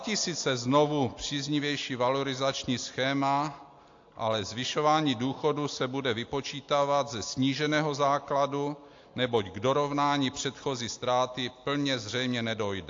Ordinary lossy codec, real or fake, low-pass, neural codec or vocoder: AAC, 48 kbps; real; 7.2 kHz; none